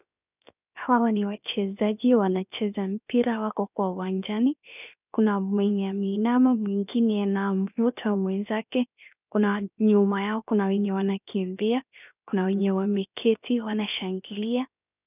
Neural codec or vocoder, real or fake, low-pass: codec, 16 kHz, 0.7 kbps, FocalCodec; fake; 3.6 kHz